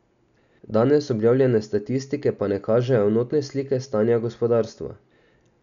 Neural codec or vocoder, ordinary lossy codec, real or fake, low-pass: none; none; real; 7.2 kHz